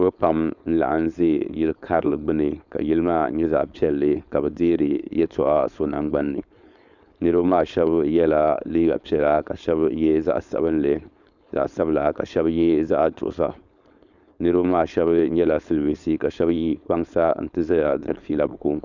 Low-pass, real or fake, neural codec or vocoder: 7.2 kHz; fake; codec, 16 kHz, 4.8 kbps, FACodec